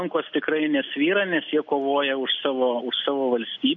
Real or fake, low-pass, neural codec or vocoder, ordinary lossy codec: real; 7.2 kHz; none; MP3, 48 kbps